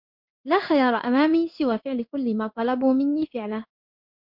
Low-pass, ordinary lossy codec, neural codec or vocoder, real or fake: 5.4 kHz; MP3, 48 kbps; codec, 16 kHz in and 24 kHz out, 1 kbps, XY-Tokenizer; fake